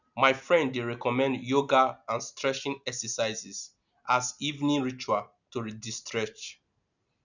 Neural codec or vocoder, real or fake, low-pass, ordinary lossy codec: none; real; 7.2 kHz; none